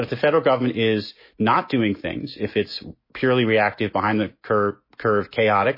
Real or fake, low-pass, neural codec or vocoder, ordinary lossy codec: fake; 5.4 kHz; autoencoder, 48 kHz, 128 numbers a frame, DAC-VAE, trained on Japanese speech; MP3, 24 kbps